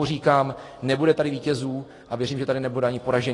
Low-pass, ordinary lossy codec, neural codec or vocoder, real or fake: 10.8 kHz; AAC, 32 kbps; none; real